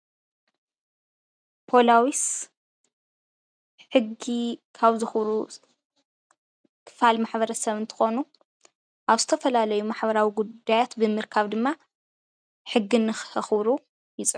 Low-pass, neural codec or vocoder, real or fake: 9.9 kHz; none; real